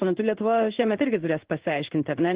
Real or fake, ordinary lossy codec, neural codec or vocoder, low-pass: fake; Opus, 16 kbps; codec, 16 kHz in and 24 kHz out, 1 kbps, XY-Tokenizer; 3.6 kHz